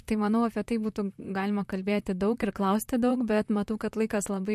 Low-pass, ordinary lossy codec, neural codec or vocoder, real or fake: 14.4 kHz; MP3, 64 kbps; vocoder, 44.1 kHz, 128 mel bands, Pupu-Vocoder; fake